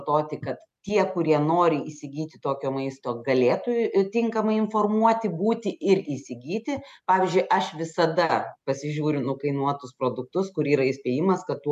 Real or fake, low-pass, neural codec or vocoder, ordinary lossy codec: real; 14.4 kHz; none; MP3, 96 kbps